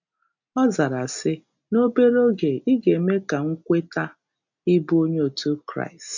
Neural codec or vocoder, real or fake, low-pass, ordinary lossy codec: none; real; 7.2 kHz; none